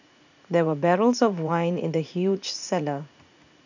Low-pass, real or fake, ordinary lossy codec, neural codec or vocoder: 7.2 kHz; fake; none; vocoder, 44.1 kHz, 80 mel bands, Vocos